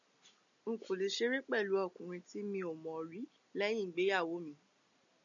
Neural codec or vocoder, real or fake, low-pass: none; real; 7.2 kHz